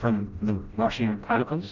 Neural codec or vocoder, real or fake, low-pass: codec, 16 kHz, 0.5 kbps, FreqCodec, smaller model; fake; 7.2 kHz